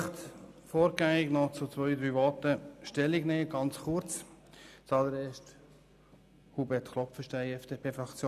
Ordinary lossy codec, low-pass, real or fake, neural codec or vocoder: none; 14.4 kHz; real; none